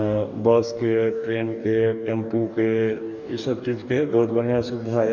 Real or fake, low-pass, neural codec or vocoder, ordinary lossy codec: fake; 7.2 kHz; codec, 44.1 kHz, 2.6 kbps, DAC; none